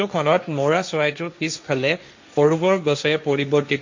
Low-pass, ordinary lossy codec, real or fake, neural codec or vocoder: 7.2 kHz; MP3, 48 kbps; fake; codec, 16 kHz, 1.1 kbps, Voila-Tokenizer